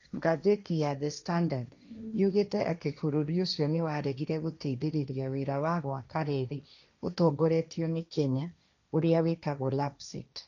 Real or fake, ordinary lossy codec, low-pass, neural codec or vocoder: fake; none; 7.2 kHz; codec, 16 kHz, 1.1 kbps, Voila-Tokenizer